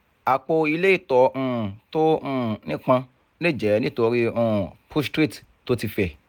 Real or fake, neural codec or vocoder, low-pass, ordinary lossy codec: real; none; 19.8 kHz; none